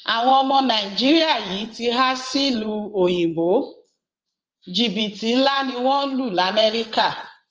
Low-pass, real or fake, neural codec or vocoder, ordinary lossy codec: 7.2 kHz; fake; vocoder, 22.05 kHz, 80 mel bands, WaveNeXt; Opus, 24 kbps